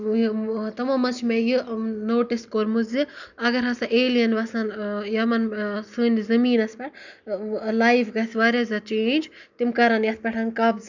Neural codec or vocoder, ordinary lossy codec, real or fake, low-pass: none; none; real; 7.2 kHz